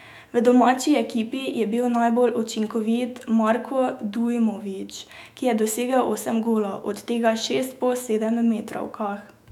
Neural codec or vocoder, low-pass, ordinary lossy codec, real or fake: autoencoder, 48 kHz, 128 numbers a frame, DAC-VAE, trained on Japanese speech; 19.8 kHz; none; fake